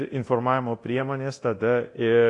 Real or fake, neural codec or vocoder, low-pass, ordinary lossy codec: fake; codec, 24 kHz, 0.9 kbps, DualCodec; 10.8 kHz; AAC, 48 kbps